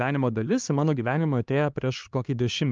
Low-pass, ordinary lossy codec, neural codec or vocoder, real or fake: 7.2 kHz; Opus, 24 kbps; codec, 16 kHz, 2 kbps, X-Codec, HuBERT features, trained on LibriSpeech; fake